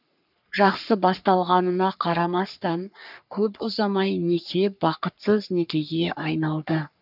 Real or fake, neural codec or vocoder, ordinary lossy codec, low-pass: fake; codec, 44.1 kHz, 3.4 kbps, Pupu-Codec; none; 5.4 kHz